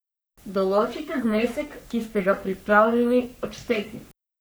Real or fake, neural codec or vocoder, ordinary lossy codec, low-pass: fake; codec, 44.1 kHz, 3.4 kbps, Pupu-Codec; none; none